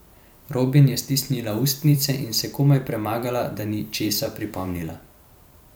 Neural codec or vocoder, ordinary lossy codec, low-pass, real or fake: vocoder, 44.1 kHz, 128 mel bands every 512 samples, BigVGAN v2; none; none; fake